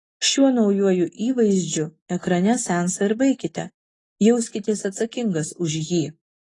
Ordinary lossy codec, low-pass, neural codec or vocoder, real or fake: AAC, 32 kbps; 10.8 kHz; none; real